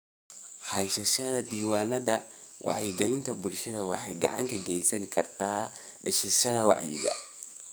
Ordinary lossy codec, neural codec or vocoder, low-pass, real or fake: none; codec, 44.1 kHz, 2.6 kbps, SNAC; none; fake